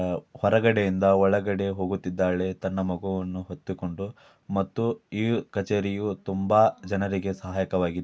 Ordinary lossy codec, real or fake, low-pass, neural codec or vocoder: none; real; none; none